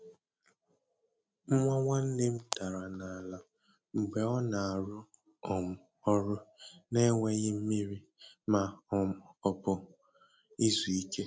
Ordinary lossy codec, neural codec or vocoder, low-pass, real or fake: none; none; none; real